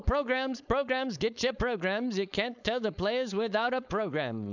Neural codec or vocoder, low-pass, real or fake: codec, 16 kHz, 4.8 kbps, FACodec; 7.2 kHz; fake